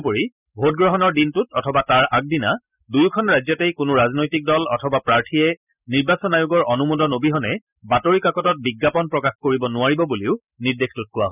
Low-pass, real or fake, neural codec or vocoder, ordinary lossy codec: 3.6 kHz; real; none; none